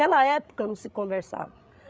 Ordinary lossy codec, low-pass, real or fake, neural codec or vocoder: none; none; fake; codec, 16 kHz, 16 kbps, FreqCodec, larger model